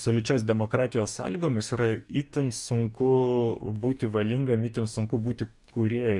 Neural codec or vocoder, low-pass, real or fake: codec, 44.1 kHz, 2.6 kbps, DAC; 10.8 kHz; fake